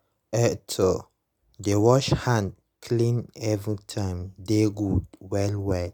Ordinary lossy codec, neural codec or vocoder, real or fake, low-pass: none; none; real; none